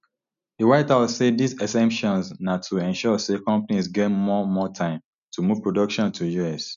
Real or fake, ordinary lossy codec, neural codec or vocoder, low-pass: real; none; none; 7.2 kHz